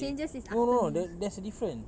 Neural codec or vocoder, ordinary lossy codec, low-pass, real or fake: none; none; none; real